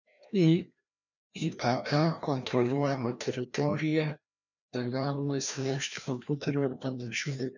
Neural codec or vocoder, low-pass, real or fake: codec, 16 kHz, 1 kbps, FreqCodec, larger model; 7.2 kHz; fake